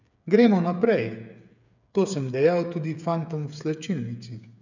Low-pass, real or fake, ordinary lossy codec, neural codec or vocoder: 7.2 kHz; fake; none; codec, 16 kHz, 8 kbps, FreqCodec, smaller model